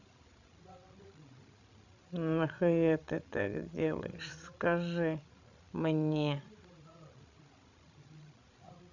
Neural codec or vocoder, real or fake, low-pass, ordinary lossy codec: codec, 16 kHz, 16 kbps, FreqCodec, larger model; fake; 7.2 kHz; none